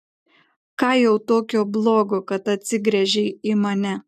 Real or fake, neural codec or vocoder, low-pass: real; none; 14.4 kHz